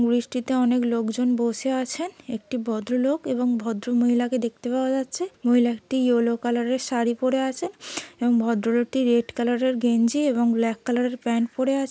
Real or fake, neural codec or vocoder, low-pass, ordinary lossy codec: real; none; none; none